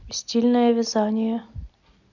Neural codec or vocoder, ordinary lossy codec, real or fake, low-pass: none; none; real; 7.2 kHz